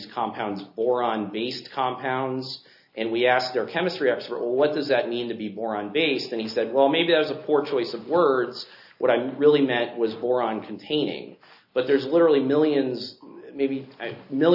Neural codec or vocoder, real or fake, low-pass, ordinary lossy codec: none; real; 5.4 kHz; AAC, 48 kbps